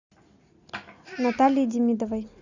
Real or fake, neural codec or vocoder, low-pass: real; none; 7.2 kHz